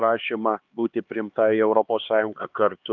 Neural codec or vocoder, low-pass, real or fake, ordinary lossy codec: codec, 16 kHz, 2 kbps, X-Codec, HuBERT features, trained on LibriSpeech; 7.2 kHz; fake; Opus, 32 kbps